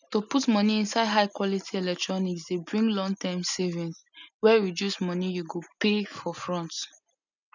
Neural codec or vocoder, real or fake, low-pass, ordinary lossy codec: none; real; 7.2 kHz; none